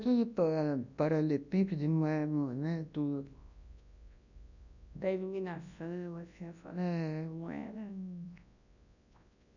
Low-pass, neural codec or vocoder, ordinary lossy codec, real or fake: 7.2 kHz; codec, 24 kHz, 0.9 kbps, WavTokenizer, large speech release; none; fake